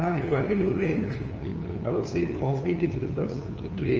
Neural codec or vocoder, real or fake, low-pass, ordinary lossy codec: codec, 16 kHz, 2 kbps, FunCodec, trained on LibriTTS, 25 frames a second; fake; 7.2 kHz; Opus, 24 kbps